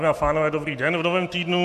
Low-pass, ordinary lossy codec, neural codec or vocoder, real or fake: 14.4 kHz; MP3, 64 kbps; none; real